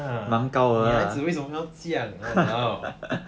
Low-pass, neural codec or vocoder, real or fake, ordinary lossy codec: none; none; real; none